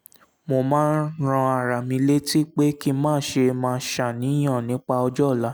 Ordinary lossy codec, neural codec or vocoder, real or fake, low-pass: none; none; real; none